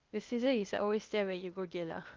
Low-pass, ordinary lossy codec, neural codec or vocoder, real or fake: 7.2 kHz; Opus, 24 kbps; codec, 16 kHz, 0.8 kbps, ZipCodec; fake